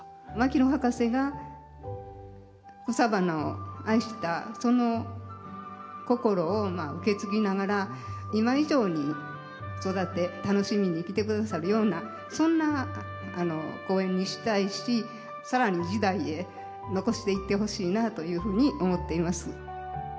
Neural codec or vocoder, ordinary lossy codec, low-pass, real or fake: none; none; none; real